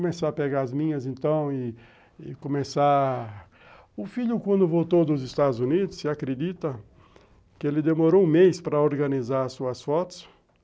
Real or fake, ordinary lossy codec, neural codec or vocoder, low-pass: real; none; none; none